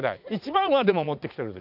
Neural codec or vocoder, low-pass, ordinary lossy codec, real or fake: vocoder, 44.1 kHz, 80 mel bands, Vocos; 5.4 kHz; none; fake